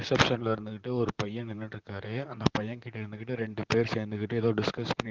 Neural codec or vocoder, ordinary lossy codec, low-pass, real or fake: vocoder, 44.1 kHz, 128 mel bands, Pupu-Vocoder; Opus, 32 kbps; 7.2 kHz; fake